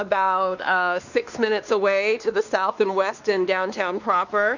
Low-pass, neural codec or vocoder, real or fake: 7.2 kHz; codec, 16 kHz, 2 kbps, X-Codec, WavLM features, trained on Multilingual LibriSpeech; fake